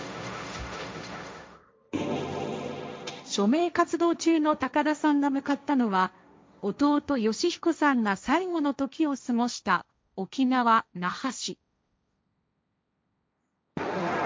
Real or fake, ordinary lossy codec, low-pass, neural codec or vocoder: fake; none; none; codec, 16 kHz, 1.1 kbps, Voila-Tokenizer